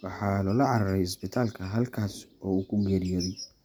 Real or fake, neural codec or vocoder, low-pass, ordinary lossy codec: real; none; none; none